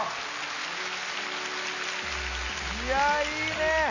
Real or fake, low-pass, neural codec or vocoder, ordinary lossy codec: real; 7.2 kHz; none; none